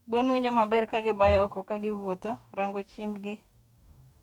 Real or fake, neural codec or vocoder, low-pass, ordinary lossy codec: fake; codec, 44.1 kHz, 2.6 kbps, DAC; 19.8 kHz; none